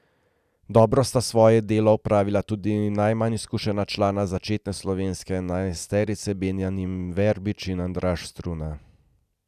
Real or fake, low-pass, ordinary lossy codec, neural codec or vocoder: real; 14.4 kHz; none; none